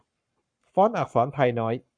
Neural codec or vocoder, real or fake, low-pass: codec, 44.1 kHz, 7.8 kbps, Pupu-Codec; fake; 9.9 kHz